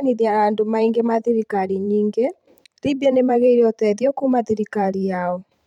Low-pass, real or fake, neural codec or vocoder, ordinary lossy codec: 19.8 kHz; fake; vocoder, 44.1 kHz, 128 mel bands every 512 samples, BigVGAN v2; none